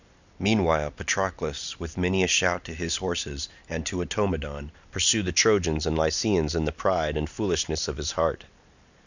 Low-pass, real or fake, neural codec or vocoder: 7.2 kHz; real; none